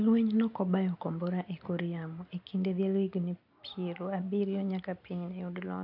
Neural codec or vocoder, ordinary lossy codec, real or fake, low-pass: none; AAC, 48 kbps; real; 5.4 kHz